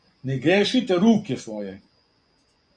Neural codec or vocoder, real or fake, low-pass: vocoder, 24 kHz, 100 mel bands, Vocos; fake; 9.9 kHz